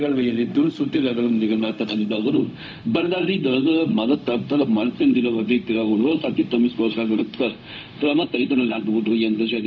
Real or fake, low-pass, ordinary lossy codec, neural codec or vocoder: fake; none; none; codec, 16 kHz, 0.4 kbps, LongCat-Audio-Codec